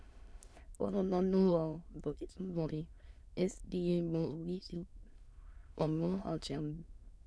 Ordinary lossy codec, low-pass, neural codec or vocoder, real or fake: none; none; autoencoder, 22.05 kHz, a latent of 192 numbers a frame, VITS, trained on many speakers; fake